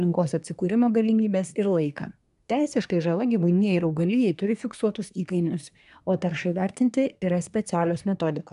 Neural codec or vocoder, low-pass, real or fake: codec, 24 kHz, 1 kbps, SNAC; 10.8 kHz; fake